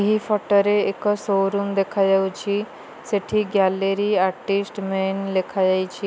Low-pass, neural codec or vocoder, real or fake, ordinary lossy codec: none; none; real; none